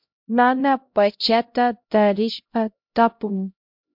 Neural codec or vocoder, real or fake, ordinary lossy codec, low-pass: codec, 16 kHz, 0.5 kbps, X-Codec, HuBERT features, trained on LibriSpeech; fake; MP3, 48 kbps; 5.4 kHz